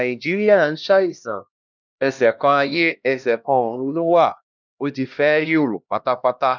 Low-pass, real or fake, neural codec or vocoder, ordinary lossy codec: 7.2 kHz; fake; codec, 16 kHz, 1 kbps, X-Codec, HuBERT features, trained on LibriSpeech; none